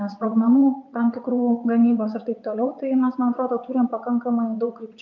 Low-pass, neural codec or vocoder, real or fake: 7.2 kHz; vocoder, 22.05 kHz, 80 mel bands, WaveNeXt; fake